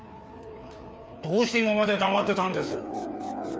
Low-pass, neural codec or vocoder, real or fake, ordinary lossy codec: none; codec, 16 kHz, 4 kbps, FreqCodec, larger model; fake; none